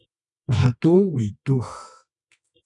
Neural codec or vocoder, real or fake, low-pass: codec, 24 kHz, 0.9 kbps, WavTokenizer, medium music audio release; fake; 10.8 kHz